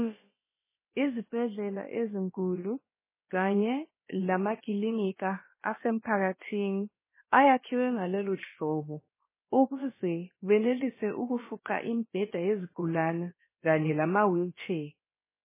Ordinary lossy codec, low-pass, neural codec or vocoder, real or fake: MP3, 16 kbps; 3.6 kHz; codec, 16 kHz, about 1 kbps, DyCAST, with the encoder's durations; fake